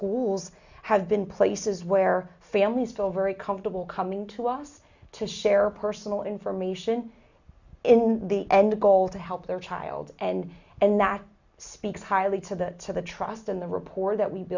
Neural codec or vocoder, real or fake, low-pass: none; real; 7.2 kHz